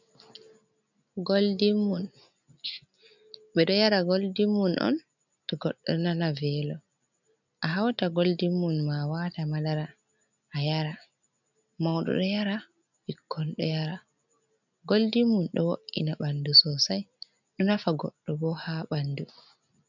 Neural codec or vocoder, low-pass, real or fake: none; 7.2 kHz; real